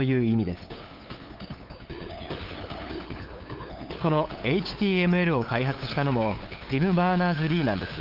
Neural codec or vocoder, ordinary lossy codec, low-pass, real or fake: codec, 16 kHz, 8 kbps, FunCodec, trained on LibriTTS, 25 frames a second; Opus, 32 kbps; 5.4 kHz; fake